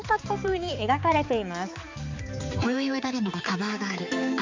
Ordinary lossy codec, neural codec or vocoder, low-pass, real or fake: MP3, 64 kbps; codec, 16 kHz, 4 kbps, X-Codec, HuBERT features, trained on balanced general audio; 7.2 kHz; fake